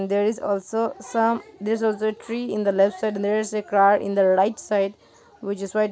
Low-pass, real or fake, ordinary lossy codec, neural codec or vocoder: none; real; none; none